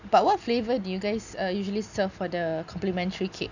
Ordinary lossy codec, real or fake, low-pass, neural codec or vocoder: none; real; 7.2 kHz; none